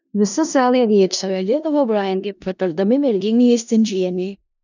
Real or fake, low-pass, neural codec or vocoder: fake; 7.2 kHz; codec, 16 kHz in and 24 kHz out, 0.4 kbps, LongCat-Audio-Codec, four codebook decoder